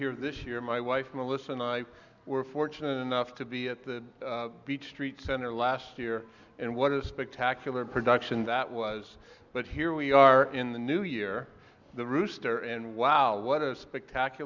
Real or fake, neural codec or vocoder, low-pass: real; none; 7.2 kHz